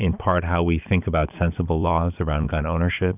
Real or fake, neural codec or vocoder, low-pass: fake; vocoder, 22.05 kHz, 80 mel bands, Vocos; 3.6 kHz